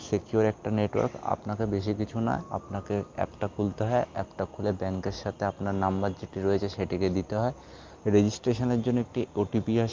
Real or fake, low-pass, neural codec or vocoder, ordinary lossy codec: real; 7.2 kHz; none; Opus, 24 kbps